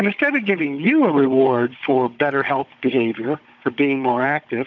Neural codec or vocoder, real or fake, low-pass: codec, 16 kHz, 16 kbps, FunCodec, trained on Chinese and English, 50 frames a second; fake; 7.2 kHz